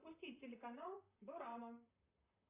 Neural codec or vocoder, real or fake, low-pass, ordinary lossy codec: vocoder, 44.1 kHz, 128 mel bands, Pupu-Vocoder; fake; 3.6 kHz; AAC, 32 kbps